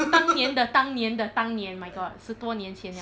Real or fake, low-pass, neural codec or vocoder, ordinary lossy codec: real; none; none; none